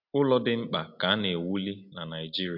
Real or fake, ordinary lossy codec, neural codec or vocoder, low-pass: real; MP3, 48 kbps; none; 5.4 kHz